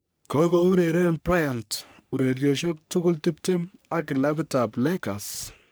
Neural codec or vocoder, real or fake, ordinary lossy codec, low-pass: codec, 44.1 kHz, 3.4 kbps, Pupu-Codec; fake; none; none